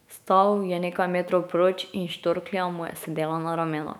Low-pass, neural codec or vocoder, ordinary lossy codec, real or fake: 19.8 kHz; autoencoder, 48 kHz, 128 numbers a frame, DAC-VAE, trained on Japanese speech; none; fake